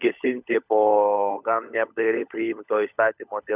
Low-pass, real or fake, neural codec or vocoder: 3.6 kHz; fake; codec, 16 kHz, 16 kbps, FunCodec, trained on LibriTTS, 50 frames a second